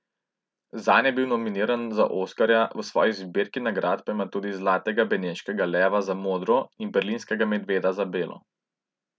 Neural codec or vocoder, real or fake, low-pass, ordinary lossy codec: none; real; none; none